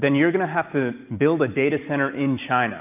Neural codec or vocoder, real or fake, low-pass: none; real; 3.6 kHz